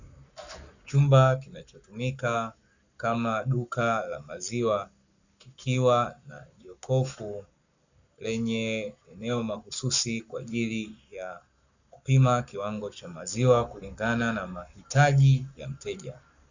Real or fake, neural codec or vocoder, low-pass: fake; codec, 44.1 kHz, 7.8 kbps, Pupu-Codec; 7.2 kHz